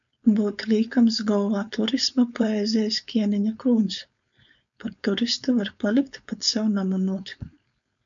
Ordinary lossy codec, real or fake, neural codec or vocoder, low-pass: MP3, 64 kbps; fake; codec, 16 kHz, 4.8 kbps, FACodec; 7.2 kHz